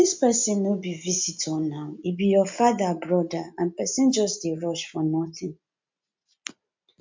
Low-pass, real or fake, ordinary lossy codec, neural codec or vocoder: 7.2 kHz; real; MP3, 64 kbps; none